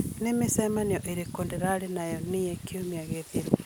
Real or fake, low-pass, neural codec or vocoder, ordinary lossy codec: real; none; none; none